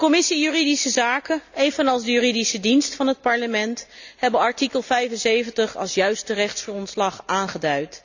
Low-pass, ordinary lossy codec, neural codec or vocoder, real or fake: 7.2 kHz; none; none; real